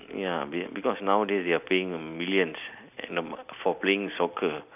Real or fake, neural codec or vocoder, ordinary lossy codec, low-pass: real; none; none; 3.6 kHz